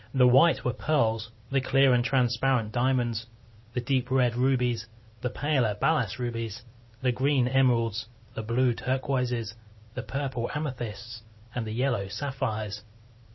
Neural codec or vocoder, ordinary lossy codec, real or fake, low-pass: none; MP3, 24 kbps; real; 7.2 kHz